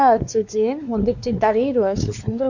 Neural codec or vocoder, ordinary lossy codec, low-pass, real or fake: codec, 16 kHz, 2 kbps, X-Codec, WavLM features, trained on Multilingual LibriSpeech; none; 7.2 kHz; fake